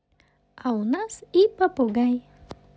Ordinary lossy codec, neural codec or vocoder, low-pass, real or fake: none; none; none; real